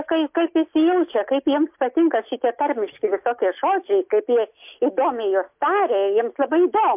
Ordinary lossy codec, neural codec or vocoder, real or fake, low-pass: MP3, 32 kbps; none; real; 3.6 kHz